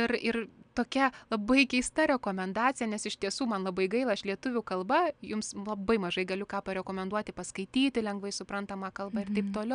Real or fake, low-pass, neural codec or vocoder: real; 9.9 kHz; none